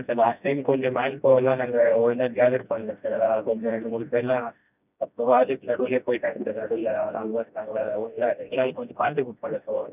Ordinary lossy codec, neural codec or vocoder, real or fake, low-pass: none; codec, 16 kHz, 1 kbps, FreqCodec, smaller model; fake; 3.6 kHz